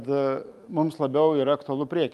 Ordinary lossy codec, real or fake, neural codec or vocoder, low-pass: Opus, 32 kbps; real; none; 14.4 kHz